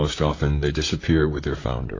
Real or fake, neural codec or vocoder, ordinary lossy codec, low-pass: fake; vocoder, 44.1 kHz, 128 mel bands, Pupu-Vocoder; AAC, 32 kbps; 7.2 kHz